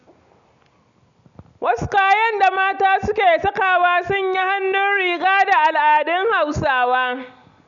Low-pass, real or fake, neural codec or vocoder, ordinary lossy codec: 7.2 kHz; real; none; none